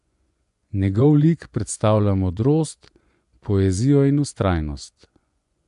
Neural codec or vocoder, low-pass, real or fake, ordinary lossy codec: vocoder, 24 kHz, 100 mel bands, Vocos; 10.8 kHz; fake; none